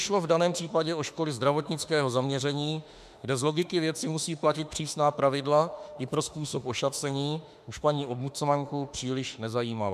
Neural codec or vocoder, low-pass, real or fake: autoencoder, 48 kHz, 32 numbers a frame, DAC-VAE, trained on Japanese speech; 14.4 kHz; fake